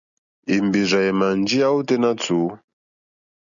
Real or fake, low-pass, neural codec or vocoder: real; 7.2 kHz; none